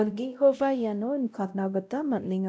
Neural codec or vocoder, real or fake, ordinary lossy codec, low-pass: codec, 16 kHz, 0.5 kbps, X-Codec, WavLM features, trained on Multilingual LibriSpeech; fake; none; none